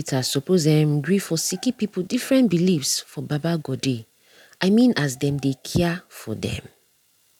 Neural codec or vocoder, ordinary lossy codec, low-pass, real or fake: none; none; 19.8 kHz; real